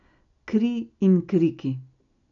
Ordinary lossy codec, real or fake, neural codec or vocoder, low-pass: MP3, 96 kbps; real; none; 7.2 kHz